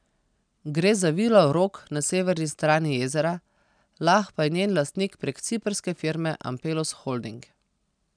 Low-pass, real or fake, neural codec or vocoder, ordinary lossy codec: 9.9 kHz; real; none; none